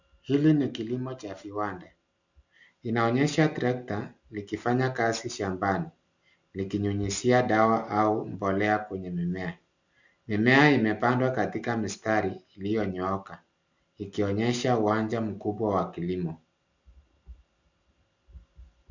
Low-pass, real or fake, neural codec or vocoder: 7.2 kHz; real; none